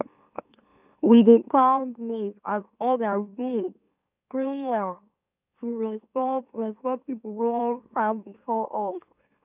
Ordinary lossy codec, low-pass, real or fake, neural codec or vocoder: none; 3.6 kHz; fake; autoencoder, 44.1 kHz, a latent of 192 numbers a frame, MeloTTS